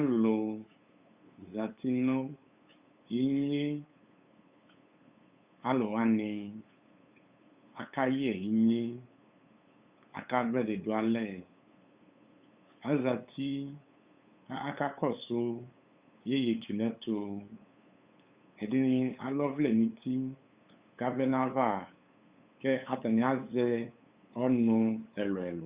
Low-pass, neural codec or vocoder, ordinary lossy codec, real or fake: 3.6 kHz; codec, 16 kHz, 8 kbps, FunCodec, trained on LibriTTS, 25 frames a second; Opus, 64 kbps; fake